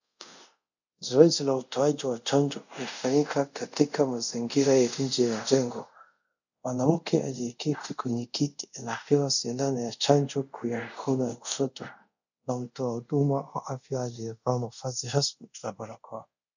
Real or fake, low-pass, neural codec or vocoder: fake; 7.2 kHz; codec, 24 kHz, 0.5 kbps, DualCodec